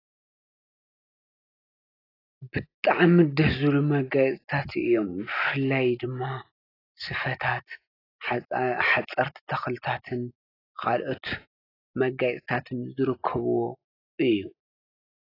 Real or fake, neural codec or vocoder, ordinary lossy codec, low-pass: real; none; AAC, 24 kbps; 5.4 kHz